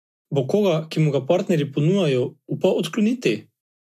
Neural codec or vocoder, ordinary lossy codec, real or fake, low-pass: none; none; real; 14.4 kHz